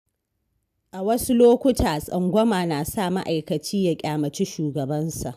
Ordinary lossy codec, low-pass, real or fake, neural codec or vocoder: none; 14.4 kHz; real; none